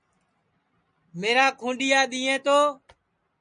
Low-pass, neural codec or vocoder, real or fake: 9.9 kHz; none; real